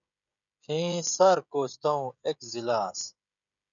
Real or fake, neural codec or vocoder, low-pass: fake; codec, 16 kHz, 16 kbps, FreqCodec, smaller model; 7.2 kHz